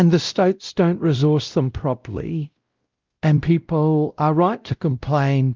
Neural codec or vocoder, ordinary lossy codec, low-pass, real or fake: codec, 16 kHz, 0.5 kbps, X-Codec, WavLM features, trained on Multilingual LibriSpeech; Opus, 32 kbps; 7.2 kHz; fake